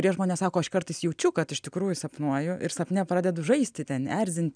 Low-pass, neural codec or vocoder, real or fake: 9.9 kHz; none; real